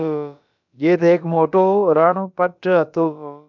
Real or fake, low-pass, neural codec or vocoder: fake; 7.2 kHz; codec, 16 kHz, about 1 kbps, DyCAST, with the encoder's durations